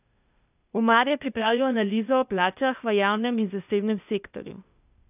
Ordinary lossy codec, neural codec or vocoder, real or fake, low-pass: none; codec, 16 kHz, 0.8 kbps, ZipCodec; fake; 3.6 kHz